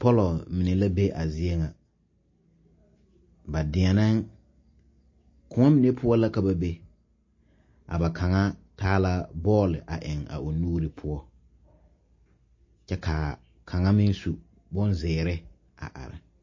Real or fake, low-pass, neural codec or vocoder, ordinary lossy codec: real; 7.2 kHz; none; MP3, 32 kbps